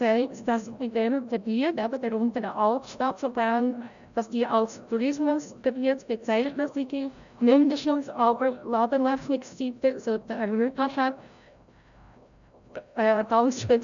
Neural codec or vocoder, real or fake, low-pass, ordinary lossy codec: codec, 16 kHz, 0.5 kbps, FreqCodec, larger model; fake; 7.2 kHz; none